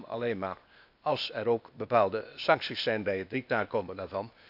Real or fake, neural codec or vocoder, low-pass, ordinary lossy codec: fake; codec, 16 kHz, 0.8 kbps, ZipCodec; 5.4 kHz; none